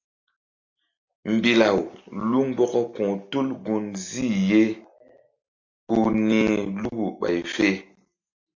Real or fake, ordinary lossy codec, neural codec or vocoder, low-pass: real; MP3, 48 kbps; none; 7.2 kHz